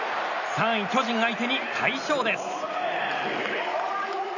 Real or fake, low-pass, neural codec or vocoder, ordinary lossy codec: real; 7.2 kHz; none; none